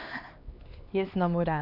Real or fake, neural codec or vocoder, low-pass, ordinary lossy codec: fake; codec, 16 kHz, 2 kbps, X-Codec, HuBERT features, trained on LibriSpeech; 5.4 kHz; none